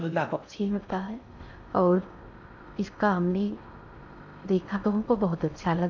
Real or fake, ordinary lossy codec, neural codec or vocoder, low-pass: fake; none; codec, 16 kHz in and 24 kHz out, 0.6 kbps, FocalCodec, streaming, 4096 codes; 7.2 kHz